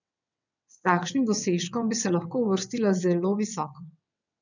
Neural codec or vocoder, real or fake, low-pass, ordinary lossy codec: vocoder, 22.05 kHz, 80 mel bands, WaveNeXt; fake; 7.2 kHz; none